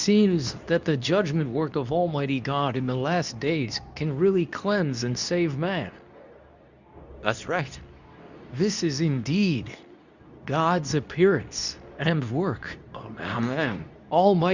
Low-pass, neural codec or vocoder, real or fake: 7.2 kHz; codec, 24 kHz, 0.9 kbps, WavTokenizer, medium speech release version 2; fake